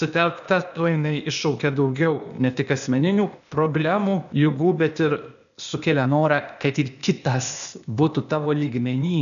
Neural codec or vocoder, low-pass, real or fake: codec, 16 kHz, 0.8 kbps, ZipCodec; 7.2 kHz; fake